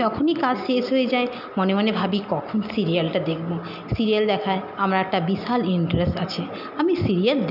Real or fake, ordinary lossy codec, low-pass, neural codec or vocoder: real; none; 5.4 kHz; none